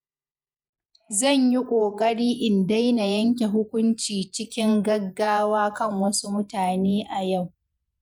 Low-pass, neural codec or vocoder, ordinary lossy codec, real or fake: 19.8 kHz; vocoder, 48 kHz, 128 mel bands, Vocos; none; fake